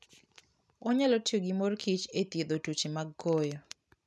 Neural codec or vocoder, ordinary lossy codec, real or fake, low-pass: none; none; real; none